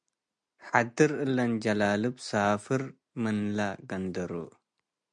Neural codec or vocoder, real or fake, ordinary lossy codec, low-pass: none; real; MP3, 64 kbps; 9.9 kHz